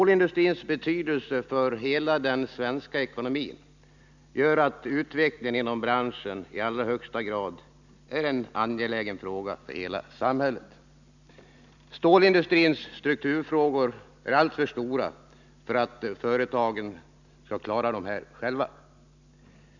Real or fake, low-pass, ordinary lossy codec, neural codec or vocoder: real; 7.2 kHz; none; none